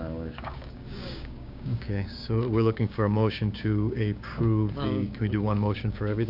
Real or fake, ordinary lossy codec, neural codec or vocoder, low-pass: fake; AAC, 48 kbps; codec, 16 kHz, 6 kbps, DAC; 5.4 kHz